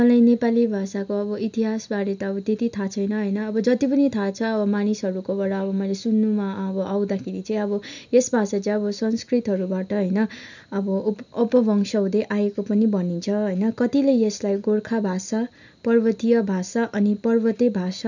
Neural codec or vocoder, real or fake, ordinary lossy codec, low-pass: none; real; none; 7.2 kHz